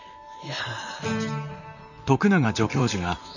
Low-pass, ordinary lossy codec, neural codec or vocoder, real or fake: 7.2 kHz; none; vocoder, 44.1 kHz, 128 mel bands, Pupu-Vocoder; fake